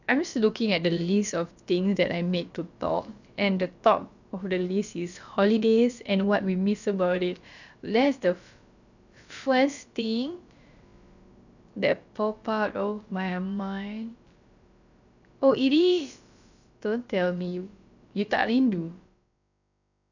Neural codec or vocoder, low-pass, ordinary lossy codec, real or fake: codec, 16 kHz, about 1 kbps, DyCAST, with the encoder's durations; 7.2 kHz; none; fake